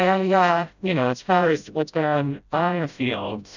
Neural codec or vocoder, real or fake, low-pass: codec, 16 kHz, 0.5 kbps, FreqCodec, smaller model; fake; 7.2 kHz